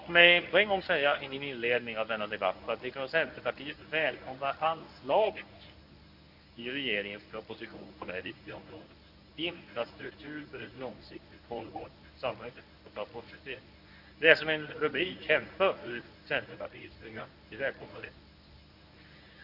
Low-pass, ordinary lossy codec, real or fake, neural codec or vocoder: 5.4 kHz; none; fake; codec, 24 kHz, 0.9 kbps, WavTokenizer, medium speech release version 1